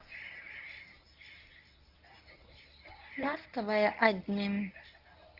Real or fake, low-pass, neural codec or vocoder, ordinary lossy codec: fake; 5.4 kHz; codec, 24 kHz, 0.9 kbps, WavTokenizer, medium speech release version 1; Opus, 64 kbps